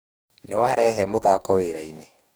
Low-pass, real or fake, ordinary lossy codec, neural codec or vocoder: none; fake; none; codec, 44.1 kHz, 2.6 kbps, DAC